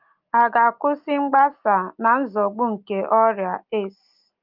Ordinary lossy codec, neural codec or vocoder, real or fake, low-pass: Opus, 32 kbps; none; real; 5.4 kHz